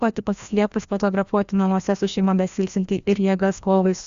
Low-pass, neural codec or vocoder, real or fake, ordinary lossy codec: 7.2 kHz; codec, 16 kHz, 1 kbps, FreqCodec, larger model; fake; Opus, 64 kbps